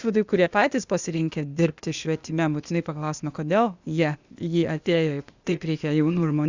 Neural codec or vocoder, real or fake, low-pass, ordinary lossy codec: codec, 16 kHz, 0.8 kbps, ZipCodec; fake; 7.2 kHz; Opus, 64 kbps